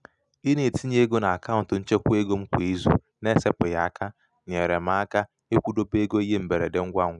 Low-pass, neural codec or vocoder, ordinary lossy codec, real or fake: 10.8 kHz; none; none; real